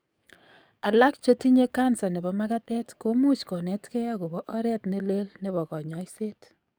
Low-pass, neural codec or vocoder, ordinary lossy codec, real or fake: none; codec, 44.1 kHz, 7.8 kbps, DAC; none; fake